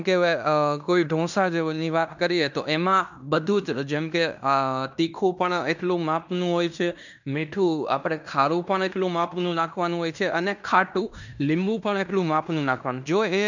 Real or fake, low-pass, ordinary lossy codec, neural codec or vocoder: fake; 7.2 kHz; none; codec, 16 kHz in and 24 kHz out, 0.9 kbps, LongCat-Audio-Codec, fine tuned four codebook decoder